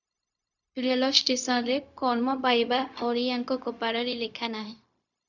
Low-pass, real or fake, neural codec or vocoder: 7.2 kHz; fake; codec, 16 kHz, 0.4 kbps, LongCat-Audio-Codec